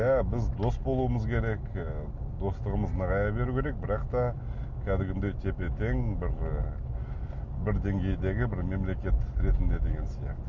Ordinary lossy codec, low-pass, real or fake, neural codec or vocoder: none; 7.2 kHz; real; none